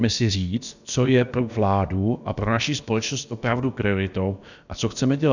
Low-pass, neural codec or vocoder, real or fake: 7.2 kHz; codec, 16 kHz, about 1 kbps, DyCAST, with the encoder's durations; fake